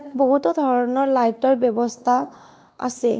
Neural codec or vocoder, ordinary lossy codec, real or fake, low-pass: codec, 16 kHz, 2 kbps, X-Codec, WavLM features, trained on Multilingual LibriSpeech; none; fake; none